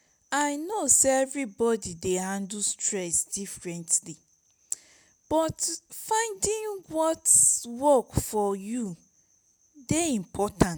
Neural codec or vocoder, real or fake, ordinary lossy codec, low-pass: none; real; none; none